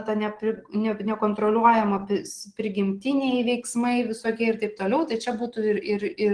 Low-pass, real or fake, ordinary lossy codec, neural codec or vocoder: 9.9 kHz; real; Opus, 16 kbps; none